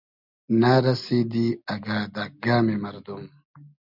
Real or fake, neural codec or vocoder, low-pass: real; none; 5.4 kHz